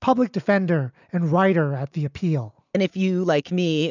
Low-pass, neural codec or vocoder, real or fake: 7.2 kHz; none; real